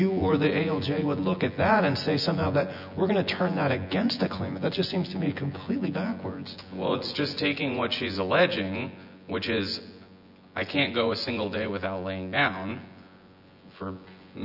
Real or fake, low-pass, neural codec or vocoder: fake; 5.4 kHz; vocoder, 24 kHz, 100 mel bands, Vocos